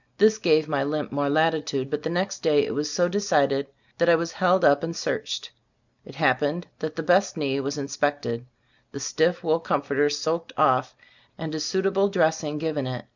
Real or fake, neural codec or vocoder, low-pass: real; none; 7.2 kHz